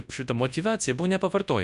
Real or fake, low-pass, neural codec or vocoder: fake; 10.8 kHz; codec, 24 kHz, 0.9 kbps, WavTokenizer, large speech release